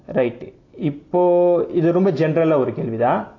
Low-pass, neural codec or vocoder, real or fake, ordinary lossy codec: 7.2 kHz; none; real; AAC, 32 kbps